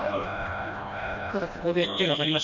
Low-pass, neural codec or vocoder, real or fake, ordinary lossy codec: 7.2 kHz; codec, 16 kHz, 1 kbps, FreqCodec, smaller model; fake; none